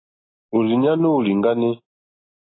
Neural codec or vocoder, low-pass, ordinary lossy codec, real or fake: none; 7.2 kHz; AAC, 16 kbps; real